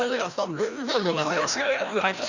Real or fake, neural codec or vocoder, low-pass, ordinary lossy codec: fake; codec, 16 kHz, 1 kbps, FreqCodec, larger model; 7.2 kHz; none